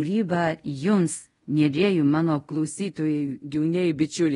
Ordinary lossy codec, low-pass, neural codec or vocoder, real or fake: AAC, 32 kbps; 10.8 kHz; codec, 24 kHz, 0.5 kbps, DualCodec; fake